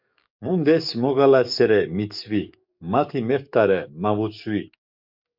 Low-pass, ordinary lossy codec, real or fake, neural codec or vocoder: 5.4 kHz; MP3, 48 kbps; fake; codec, 44.1 kHz, 7.8 kbps, DAC